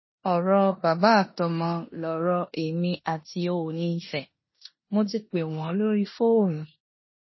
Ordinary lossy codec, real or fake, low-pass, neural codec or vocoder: MP3, 24 kbps; fake; 7.2 kHz; codec, 16 kHz in and 24 kHz out, 0.9 kbps, LongCat-Audio-Codec, four codebook decoder